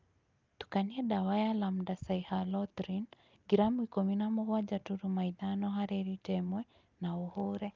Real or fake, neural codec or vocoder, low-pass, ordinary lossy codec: real; none; 7.2 kHz; Opus, 24 kbps